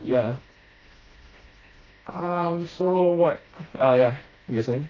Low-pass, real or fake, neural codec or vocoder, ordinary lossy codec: 7.2 kHz; fake; codec, 16 kHz, 1 kbps, FreqCodec, smaller model; AAC, 32 kbps